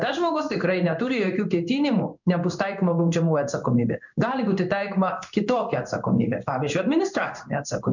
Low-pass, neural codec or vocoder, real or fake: 7.2 kHz; codec, 16 kHz in and 24 kHz out, 1 kbps, XY-Tokenizer; fake